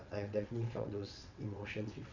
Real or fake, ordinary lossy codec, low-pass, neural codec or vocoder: fake; none; 7.2 kHz; vocoder, 22.05 kHz, 80 mel bands, WaveNeXt